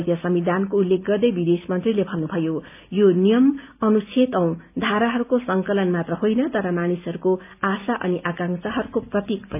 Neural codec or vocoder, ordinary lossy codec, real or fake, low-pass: none; AAC, 32 kbps; real; 3.6 kHz